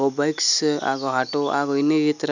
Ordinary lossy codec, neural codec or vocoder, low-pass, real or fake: none; none; 7.2 kHz; real